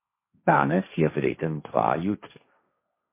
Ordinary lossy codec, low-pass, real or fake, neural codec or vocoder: MP3, 24 kbps; 3.6 kHz; fake; codec, 16 kHz, 1.1 kbps, Voila-Tokenizer